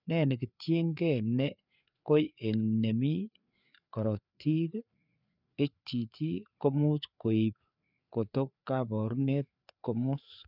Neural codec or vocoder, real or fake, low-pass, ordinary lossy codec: codec, 16 kHz, 4 kbps, FreqCodec, larger model; fake; 5.4 kHz; none